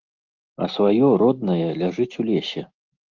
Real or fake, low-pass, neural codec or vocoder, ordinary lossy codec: real; 7.2 kHz; none; Opus, 32 kbps